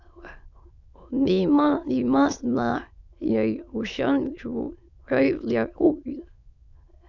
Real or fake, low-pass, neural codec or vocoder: fake; 7.2 kHz; autoencoder, 22.05 kHz, a latent of 192 numbers a frame, VITS, trained on many speakers